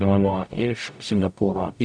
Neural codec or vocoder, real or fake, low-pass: codec, 44.1 kHz, 0.9 kbps, DAC; fake; 9.9 kHz